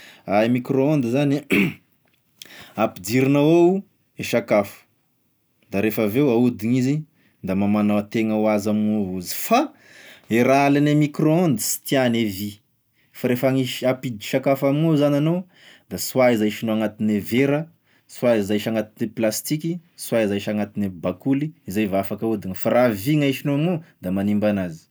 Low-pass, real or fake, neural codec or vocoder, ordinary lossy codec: none; real; none; none